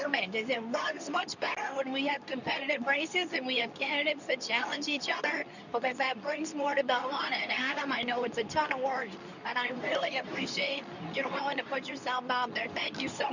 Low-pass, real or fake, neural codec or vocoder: 7.2 kHz; fake; codec, 24 kHz, 0.9 kbps, WavTokenizer, medium speech release version 2